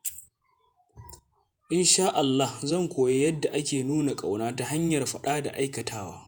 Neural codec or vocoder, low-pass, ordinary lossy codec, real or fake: vocoder, 48 kHz, 128 mel bands, Vocos; none; none; fake